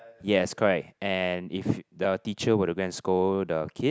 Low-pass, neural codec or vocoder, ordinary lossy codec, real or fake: none; none; none; real